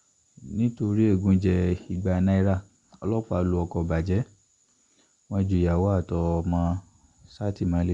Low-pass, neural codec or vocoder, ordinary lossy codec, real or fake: 10.8 kHz; none; Opus, 64 kbps; real